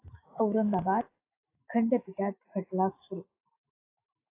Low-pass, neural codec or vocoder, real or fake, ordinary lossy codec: 3.6 kHz; autoencoder, 48 kHz, 128 numbers a frame, DAC-VAE, trained on Japanese speech; fake; AAC, 24 kbps